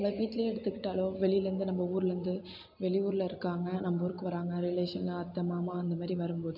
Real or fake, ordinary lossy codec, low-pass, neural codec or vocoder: real; none; 5.4 kHz; none